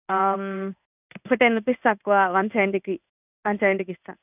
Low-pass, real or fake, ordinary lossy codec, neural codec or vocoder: 3.6 kHz; fake; none; codec, 16 kHz in and 24 kHz out, 1 kbps, XY-Tokenizer